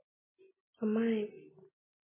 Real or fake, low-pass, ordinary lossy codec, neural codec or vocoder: real; 3.6 kHz; MP3, 16 kbps; none